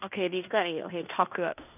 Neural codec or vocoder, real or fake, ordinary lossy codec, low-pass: codec, 16 kHz in and 24 kHz out, 0.9 kbps, LongCat-Audio-Codec, fine tuned four codebook decoder; fake; none; 3.6 kHz